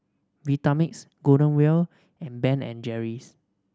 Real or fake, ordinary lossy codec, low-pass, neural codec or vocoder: real; none; none; none